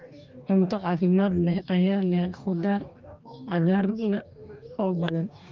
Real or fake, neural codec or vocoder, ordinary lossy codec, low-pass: fake; codec, 44.1 kHz, 2.6 kbps, DAC; Opus, 24 kbps; 7.2 kHz